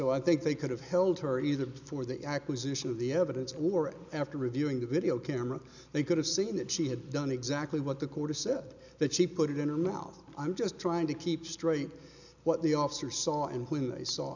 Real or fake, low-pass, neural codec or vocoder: real; 7.2 kHz; none